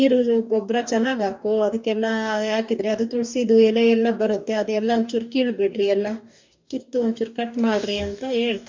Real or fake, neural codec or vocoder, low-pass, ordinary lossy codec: fake; codec, 44.1 kHz, 2.6 kbps, DAC; 7.2 kHz; MP3, 64 kbps